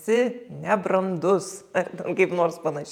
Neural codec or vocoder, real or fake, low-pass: vocoder, 48 kHz, 128 mel bands, Vocos; fake; 19.8 kHz